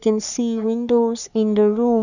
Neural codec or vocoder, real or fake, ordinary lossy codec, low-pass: codec, 44.1 kHz, 3.4 kbps, Pupu-Codec; fake; none; 7.2 kHz